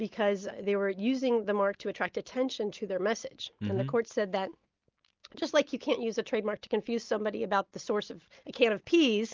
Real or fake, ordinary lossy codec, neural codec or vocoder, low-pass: real; Opus, 24 kbps; none; 7.2 kHz